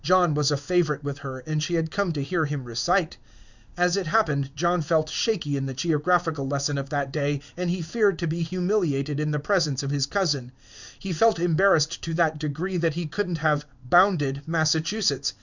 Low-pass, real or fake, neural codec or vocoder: 7.2 kHz; fake; codec, 16 kHz in and 24 kHz out, 1 kbps, XY-Tokenizer